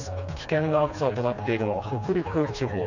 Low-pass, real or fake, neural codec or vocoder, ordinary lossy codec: 7.2 kHz; fake; codec, 16 kHz, 2 kbps, FreqCodec, smaller model; none